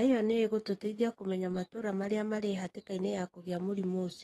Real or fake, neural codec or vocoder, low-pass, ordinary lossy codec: fake; codec, 44.1 kHz, 7.8 kbps, DAC; 19.8 kHz; AAC, 32 kbps